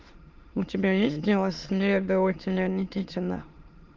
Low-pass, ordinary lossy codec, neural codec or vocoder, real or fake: 7.2 kHz; Opus, 32 kbps; autoencoder, 22.05 kHz, a latent of 192 numbers a frame, VITS, trained on many speakers; fake